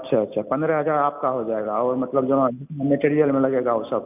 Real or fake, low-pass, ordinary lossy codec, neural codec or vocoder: real; 3.6 kHz; none; none